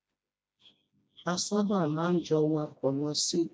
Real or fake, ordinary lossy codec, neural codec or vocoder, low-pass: fake; none; codec, 16 kHz, 1 kbps, FreqCodec, smaller model; none